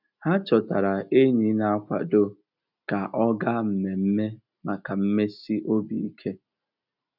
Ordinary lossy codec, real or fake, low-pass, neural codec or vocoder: none; real; 5.4 kHz; none